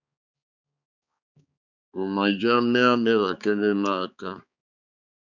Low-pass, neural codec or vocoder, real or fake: 7.2 kHz; codec, 16 kHz, 4 kbps, X-Codec, HuBERT features, trained on balanced general audio; fake